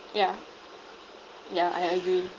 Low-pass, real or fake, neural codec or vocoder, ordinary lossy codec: 7.2 kHz; real; none; Opus, 16 kbps